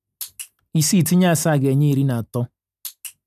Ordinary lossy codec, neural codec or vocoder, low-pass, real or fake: none; none; 14.4 kHz; real